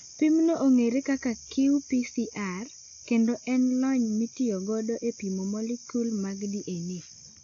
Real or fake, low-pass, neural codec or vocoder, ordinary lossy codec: real; 7.2 kHz; none; AAC, 48 kbps